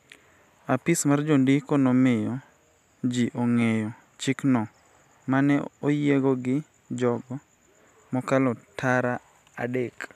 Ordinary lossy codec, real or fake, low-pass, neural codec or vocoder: none; real; 14.4 kHz; none